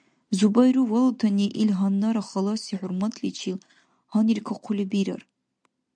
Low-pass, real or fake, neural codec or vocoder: 9.9 kHz; real; none